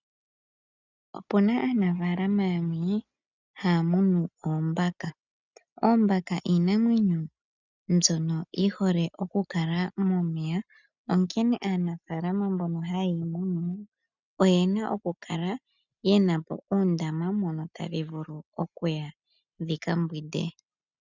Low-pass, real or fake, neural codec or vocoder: 7.2 kHz; real; none